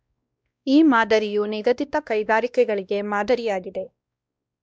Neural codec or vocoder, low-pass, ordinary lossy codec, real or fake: codec, 16 kHz, 1 kbps, X-Codec, WavLM features, trained on Multilingual LibriSpeech; none; none; fake